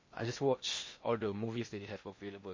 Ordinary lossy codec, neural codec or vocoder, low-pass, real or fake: MP3, 32 kbps; codec, 16 kHz in and 24 kHz out, 0.8 kbps, FocalCodec, streaming, 65536 codes; 7.2 kHz; fake